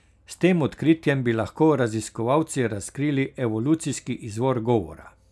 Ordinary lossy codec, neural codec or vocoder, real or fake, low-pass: none; none; real; none